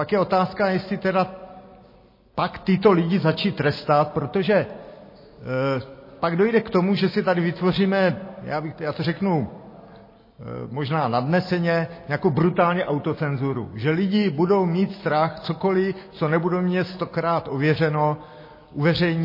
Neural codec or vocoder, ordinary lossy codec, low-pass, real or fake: none; MP3, 24 kbps; 5.4 kHz; real